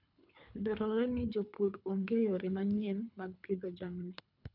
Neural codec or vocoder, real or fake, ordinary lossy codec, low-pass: codec, 24 kHz, 3 kbps, HILCodec; fake; none; 5.4 kHz